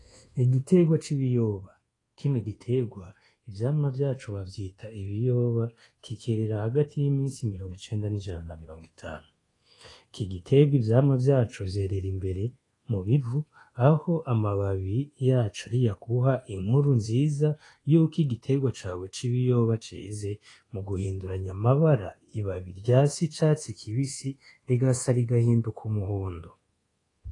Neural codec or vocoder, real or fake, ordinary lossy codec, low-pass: codec, 24 kHz, 1.2 kbps, DualCodec; fake; AAC, 32 kbps; 10.8 kHz